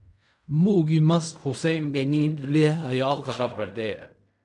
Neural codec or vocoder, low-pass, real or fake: codec, 16 kHz in and 24 kHz out, 0.4 kbps, LongCat-Audio-Codec, fine tuned four codebook decoder; 10.8 kHz; fake